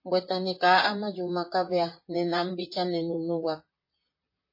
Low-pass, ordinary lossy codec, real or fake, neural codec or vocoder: 5.4 kHz; MP3, 24 kbps; fake; vocoder, 44.1 kHz, 128 mel bands, Pupu-Vocoder